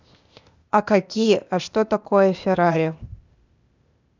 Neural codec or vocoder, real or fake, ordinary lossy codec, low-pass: codec, 16 kHz, 0.8 kbps, ZipCodec; fake; none; 7.2 kHz